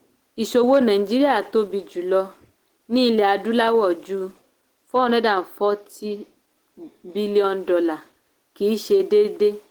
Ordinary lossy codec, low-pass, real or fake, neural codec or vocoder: Opus, 16 kbps; 19.8 kHz; real; none